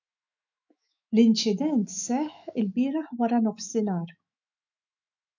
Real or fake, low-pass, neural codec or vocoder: fake; 7.2 kHz; autoencoder, 48 kHz, 128 numbers a frame, DAC-VAE, trained on Japanese speech